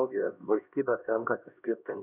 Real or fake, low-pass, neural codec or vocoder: fake; 3.6 kHz; codec, 16 kHz, 1 kbps, X-Codec, HuBERT features, trained on LibriSpeech